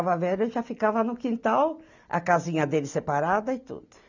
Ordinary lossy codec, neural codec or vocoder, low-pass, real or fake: none; none; 7.2 kHz; real